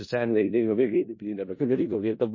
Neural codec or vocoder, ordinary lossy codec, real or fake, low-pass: codec, 16 kHz in and 24 kHz out, 0.4 kbps, LongCat-Audio-Codec, four codebook decoder; MP3, 32 kbps; fake; 7.2 kHz